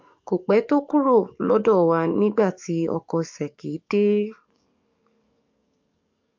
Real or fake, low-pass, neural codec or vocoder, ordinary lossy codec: fake; 7.2 kHz; codec, 44.1 kHz, 7.8 kbps, DAC; MP3, 48 kbps